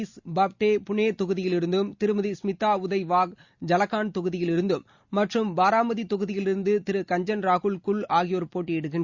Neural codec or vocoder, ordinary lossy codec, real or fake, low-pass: none; Opus, 64 kbps; real; 7.2 kHz